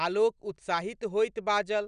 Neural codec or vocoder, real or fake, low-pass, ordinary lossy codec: none; real; 9.9 kHz; none